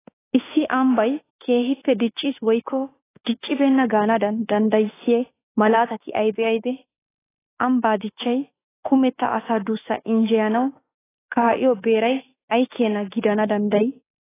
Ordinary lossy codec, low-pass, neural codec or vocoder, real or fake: AAC, 16 kbps; 3.6 kHz; autoencoder, 48 kHz, 32 numbers a frame, DAC-VAE, trained on Japanese speech; fake